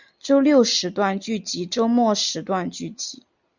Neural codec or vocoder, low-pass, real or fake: none; 7.2 kHz; real